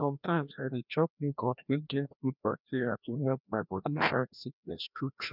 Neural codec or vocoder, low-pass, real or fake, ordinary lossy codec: codec, 16 kHz, 1 kbps, FreqCodec, larger model; 5.4 kHz; fake; none